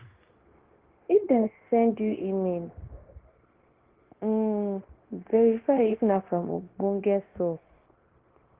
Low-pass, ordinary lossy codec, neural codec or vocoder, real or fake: 3.6 kHz; Opus, 16 kbps; codec, 16 kHz, 0.9 kbps, LongCat-Audio-Codec; fake